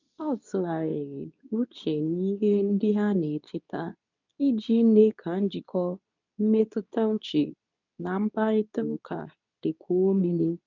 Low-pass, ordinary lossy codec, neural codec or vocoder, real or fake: 7.2 kHz; none; codec, 24 kHz, 0.9 kbps, WavTokenizer, medium speech release version 2; fake